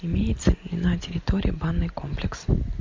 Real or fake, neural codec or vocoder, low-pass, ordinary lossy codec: real; none; 7.2 kHz; MP3, 48 kbps